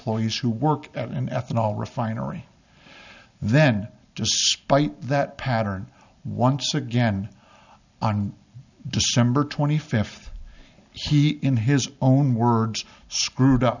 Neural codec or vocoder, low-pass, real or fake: none; 7.2 kHz; real